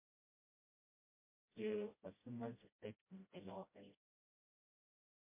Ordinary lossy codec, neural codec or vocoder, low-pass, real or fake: MP3, 24 kbps; codec, 16 kHz, 0.5 kbps, FreqCodec, smaller model; 3.6 kHz; fake